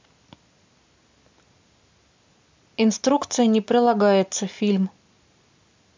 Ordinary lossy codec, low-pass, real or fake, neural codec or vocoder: MP3, 64 kbps; 7.2 kHz; real; none